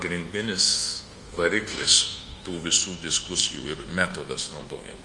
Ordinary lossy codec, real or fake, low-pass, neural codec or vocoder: Opus, 24 kbps; fake; 10.8 kHz; codec, 24 kHz, 1.2 kbps, DualCodec